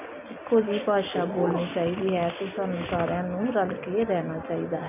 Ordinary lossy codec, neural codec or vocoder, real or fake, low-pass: none; none; real; 3.6 kHz